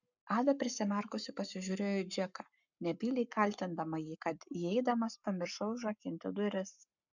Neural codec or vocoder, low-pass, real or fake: codec, 44.1 kHz, 7.8 kbps, Pupu-Codec; 7.2 kHz; fake